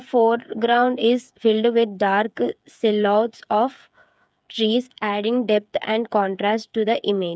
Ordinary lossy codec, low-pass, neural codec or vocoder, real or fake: none; none; codec, 16 kHz, 8 kbps, FreqCodec, smaller model; fake